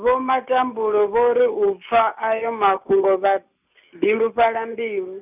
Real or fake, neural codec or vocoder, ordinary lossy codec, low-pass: real; none; none; 3.6 kHz